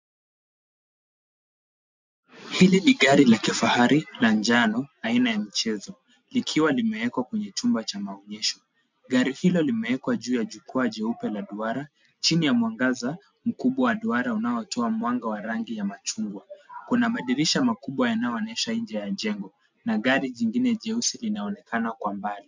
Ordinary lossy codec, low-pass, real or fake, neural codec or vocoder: MP3, 64 kbps; 7.2 kHz; real; none